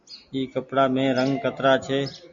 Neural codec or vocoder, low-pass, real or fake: none; 7.2 kHz; real